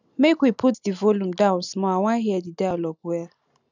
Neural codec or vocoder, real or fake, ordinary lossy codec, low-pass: none; real; none; 7.2 kHz